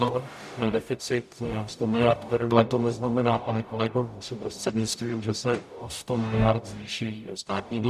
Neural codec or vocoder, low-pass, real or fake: codec, 44.1 kHz, 0.9 kbps, DAC; 14.4 kHz; fake